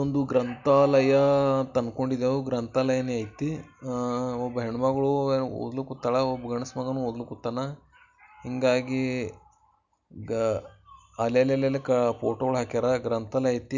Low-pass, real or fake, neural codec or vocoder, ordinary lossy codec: 7.2 kHz; real; none; none